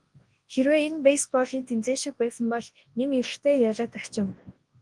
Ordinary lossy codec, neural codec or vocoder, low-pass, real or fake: Opus, 24 kbps; codec, 24 kHz, 0.9 kbps, WavTokenizer, large speech release; 10.8 kHz; fake